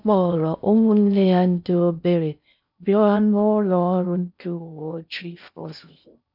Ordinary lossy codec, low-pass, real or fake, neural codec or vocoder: none; 5.4 kHz; fake; codec, 16 kHz in and 24 kHz out, 0.6 kbps, FocalCodec, streaming, 4096 codes